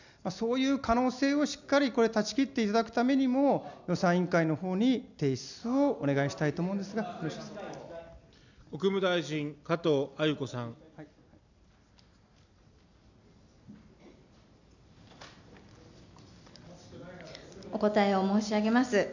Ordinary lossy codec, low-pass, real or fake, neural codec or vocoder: none; 7.2 kHz; real; none